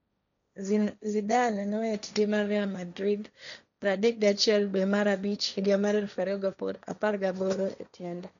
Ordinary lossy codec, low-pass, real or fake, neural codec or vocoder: none; 7.2 kHz; fake; codec, 16 kHz, 1.1 kbps, Voila-Tokenizer